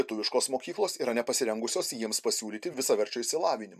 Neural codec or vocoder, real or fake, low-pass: vocoder, 44.1 kHz, 128 mel bands every 512 samples, BigVGAN v2; fake; 14.4 kHz